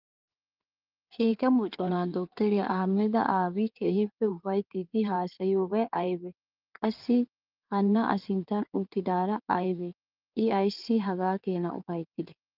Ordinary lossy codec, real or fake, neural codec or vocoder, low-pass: Opus, 24 kbps; fake; codec, 16 kHz in and 24 kHz out, 2.2 kbps, FireRedTTS-2 codec; 5.4 kHz